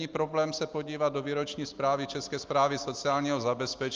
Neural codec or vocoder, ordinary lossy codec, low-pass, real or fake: none; Opus, 24 kbps; 7.2 kHz; real